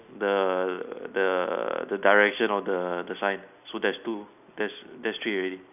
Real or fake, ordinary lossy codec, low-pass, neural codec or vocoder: real; none; 3.6 kHz; none